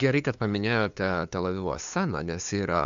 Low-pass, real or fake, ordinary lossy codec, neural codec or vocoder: 7.2 kHz; fake; AAC, 96 kbps; codec, 16 kHz, 2 kbps, FunCodec, trained on LibriTTS, 25 frames a second